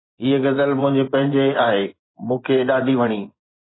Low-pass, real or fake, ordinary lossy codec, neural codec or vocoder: 7.2 kHz; fake; AAC, 16 kbps; vocoder, 24 kHz, 100 mel bands, Vocos